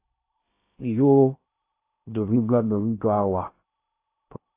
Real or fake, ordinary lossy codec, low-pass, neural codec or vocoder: fake; AAC, 24 kbps; 3.6 kHz; codec, 16 kHz in and 24 kHz out, 0.6 kbps, FocalCodec, streaming, 2048 codes